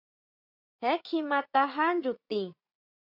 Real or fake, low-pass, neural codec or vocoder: fake; 5.4 kHz; vocoder, 44.1 kHz, 80 mel bands, Vocos